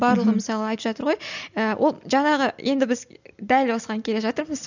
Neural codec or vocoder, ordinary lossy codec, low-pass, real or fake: none; none; 7.2 kHz; real